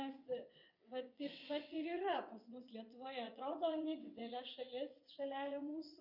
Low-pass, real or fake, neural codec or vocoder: 5.4 kHz; fake; vocoder, 22.05 kHz, 80 mel bands, WaveNeXt